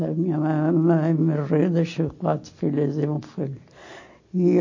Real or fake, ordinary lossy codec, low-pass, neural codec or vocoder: real; MP3, 32 kbps; 7.2 kHz; none